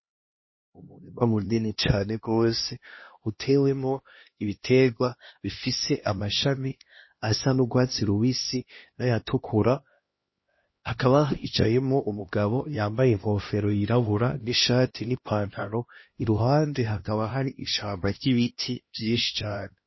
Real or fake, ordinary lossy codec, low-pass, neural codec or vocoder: fake; MP3, 24 kbps; 7.2 kHz; codec, 16 kHz, 1 kbps, X-Codec, HuBERT features, trained on LibriSpeech